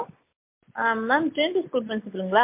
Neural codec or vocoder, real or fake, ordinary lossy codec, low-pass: none; real; MP3, 32 kbps; 3.6 kHz